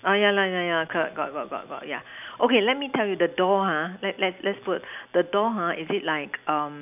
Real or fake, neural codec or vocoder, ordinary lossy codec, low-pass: real; none; none; 3.6 kHz